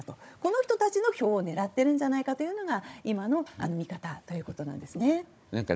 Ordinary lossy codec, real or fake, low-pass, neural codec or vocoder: none; fake; none; codec, 16 kHz, 16 kbps, FunCodec, trained on Chinese and English, 50 frames a second